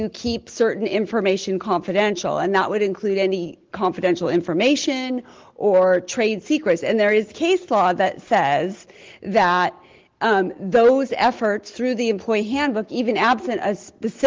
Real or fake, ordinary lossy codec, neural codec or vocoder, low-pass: real; Opus, 16 kbps; none; 7.2 kHz